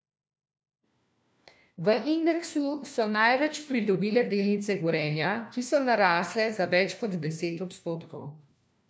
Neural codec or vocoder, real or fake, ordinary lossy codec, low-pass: codec, 16 kHz, 1 kbps, FunCodec, trained on LibriTTS, 50 frames a second; fake; none; none